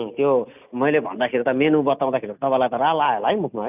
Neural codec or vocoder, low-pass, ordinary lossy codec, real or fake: none; 3.6 kHz; none; real